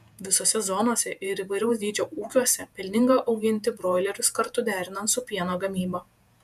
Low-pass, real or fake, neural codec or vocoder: 14.4 kHz; fake; vocoder, 48 kHz, 128 mel bands, Vocos